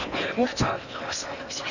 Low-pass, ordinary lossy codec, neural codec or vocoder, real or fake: 7.2 kHz; none; codec, 16 kHz in and 24 kHz out, 0.8 kbps, FocalCodec, streaming, 65536 codes; fake